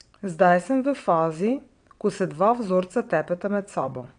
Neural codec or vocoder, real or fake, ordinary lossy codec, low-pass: vocoder, 22.05 kHz, 80 mel bands, WaveNeXt; fake; none; 9.9 kHz